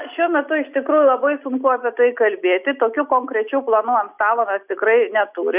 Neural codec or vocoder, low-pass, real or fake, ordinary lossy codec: none; 3.6 kHz; real; Opus, 64 kbps